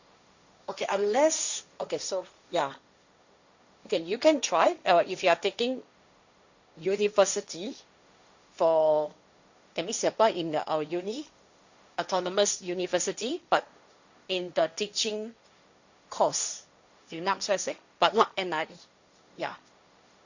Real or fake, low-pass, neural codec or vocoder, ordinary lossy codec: fake; 7.2 kHz; codec, 16 kHz, 1.1 kbps, Voila-Tokenizer; Opus, 64 kbps